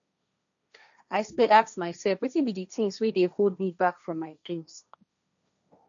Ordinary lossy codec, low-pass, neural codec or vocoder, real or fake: none; 7.2 kHz; codec, 16 kHz, 1.1 kbps, Voila-Tokenizer; fake